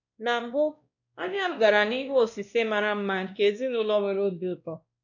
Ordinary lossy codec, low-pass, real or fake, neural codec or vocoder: none; 7.2 kHz; fake; codec, 16 kHz, 1 kbps, X-Codec, WavLM features, trained on Multilingual LibriSpeech